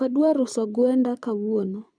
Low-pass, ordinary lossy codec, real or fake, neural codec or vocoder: 9.9 kHz; none; fake; codec, 24 kHz, 6 kbps, HILCodec